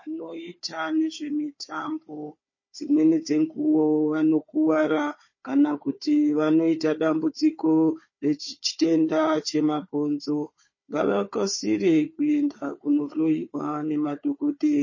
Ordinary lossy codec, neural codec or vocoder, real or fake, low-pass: MP3, 32 kbps; codec, 16 kHz, 4 kbps, FunCodec, trained on Chinese and English, 50 frames a second; fake; 7.2 kHz